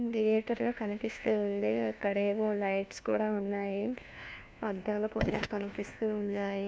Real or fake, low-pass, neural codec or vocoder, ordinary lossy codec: fake; none; codec, 16 kHz, 1 kbps, FunCodec, trained on LibriTTS, 50 frames a second; none